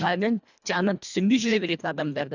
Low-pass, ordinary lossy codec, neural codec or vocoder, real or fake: 7.2 kHz; none; codec, 24 kHz, 1.5 kbps, HILCodec; fake